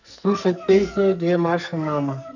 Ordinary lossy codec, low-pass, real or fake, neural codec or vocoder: none; 7.2 kHz; fake; codec, 44.1 kHz, 2.6 kbps, SNAC